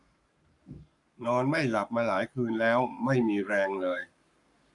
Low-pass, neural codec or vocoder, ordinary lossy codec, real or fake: 10.8 kHz; codec, 44.1 kHz, 7.8 kbps, Pupu-Codec; none; fake